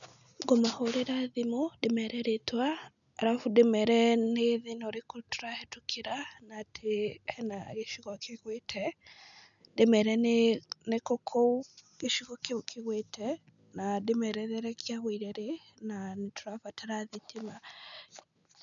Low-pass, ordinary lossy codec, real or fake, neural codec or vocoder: 7.2 kHz; none; real; none